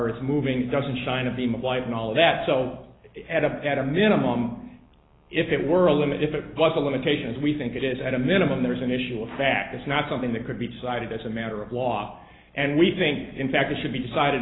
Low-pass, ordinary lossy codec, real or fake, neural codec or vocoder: 7.2 kHz; AAC, 16 kbps; real; none